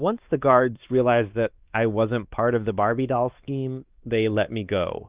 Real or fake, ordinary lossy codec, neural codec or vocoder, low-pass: fake; Opus, 32 kbps; codec, 16 kHz, 2 kbps, X-Codec, WavLM features, trained on Multilingual LibriSpeech; 3.6 kHz